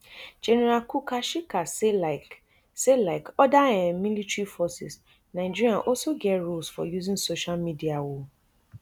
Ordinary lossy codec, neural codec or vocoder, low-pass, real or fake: none; none; 19.8 kHz; real